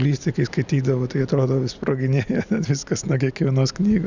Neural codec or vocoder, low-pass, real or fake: none; 7.2 kHz; real